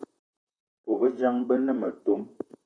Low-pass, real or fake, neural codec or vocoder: 9.9 kHz; fake; vocoder, 44.1 kHz, 128 mel bands, Pupu-Vocoder